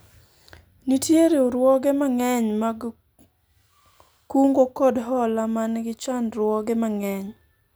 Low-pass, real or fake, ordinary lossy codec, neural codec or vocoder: none; real; none; none